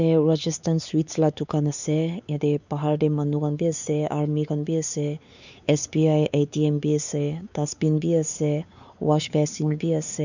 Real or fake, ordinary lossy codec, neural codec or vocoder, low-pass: fake; none; codec, 16 kHz, 4 kbps, X-Codec, WavLM features, trained on Multilingual LibriSpeech; 7.2 kHz